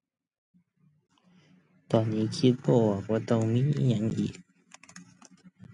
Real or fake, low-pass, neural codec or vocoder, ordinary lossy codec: real; 10.8 kHz; none; none